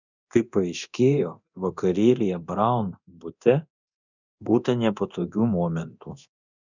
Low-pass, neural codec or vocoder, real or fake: 7.2 kHz; codec, 24 kHz, 0.9 kbps, DualCodec; fake